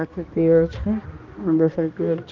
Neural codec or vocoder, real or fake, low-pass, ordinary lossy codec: codec, 16 kHz, 1 kbps, X-Codec, HuBERT features, trained on balanced general audio; fake; 7.2 kHz; Opus, 24 kbps